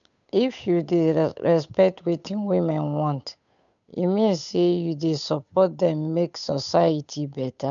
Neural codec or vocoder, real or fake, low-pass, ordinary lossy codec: codec, 16 kHz, 8 kbps, FunCodec, trained on Chinese and English, 25 frames a second; fake; 7.2 kHz; none